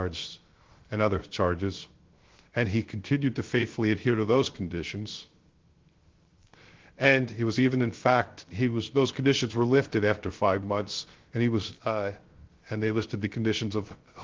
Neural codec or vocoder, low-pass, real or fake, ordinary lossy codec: codec, 16 kHz, 0.3 kbps, FocalCodec; 7.2 kHz; fake; Opus, 16 kbps